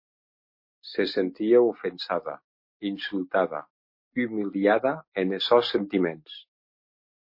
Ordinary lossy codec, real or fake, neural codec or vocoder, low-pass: MP3, 32 kbps; real; none; 5.4 kHz